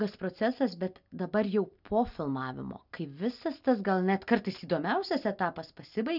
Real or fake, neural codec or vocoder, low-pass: real; none; 5.4 kHz